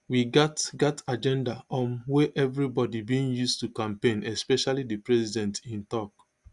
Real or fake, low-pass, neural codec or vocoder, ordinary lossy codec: real; 10.8 kHz; none; Opus, 64 kbps